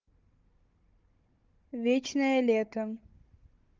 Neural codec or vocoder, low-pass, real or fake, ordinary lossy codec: codec, 16 kHz, 16 kbps, FunCodec, trained on Chinese and English, 50 frames a second; 7.2 kHz; fake; Opus, 32 kbps